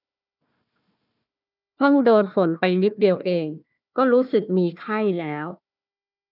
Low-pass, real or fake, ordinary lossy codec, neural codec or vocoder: 5.4 kHz; fake; none; codec, 16 kHz, 1 kbps, FunCodec, trained on Chinese and English, 50 frames a second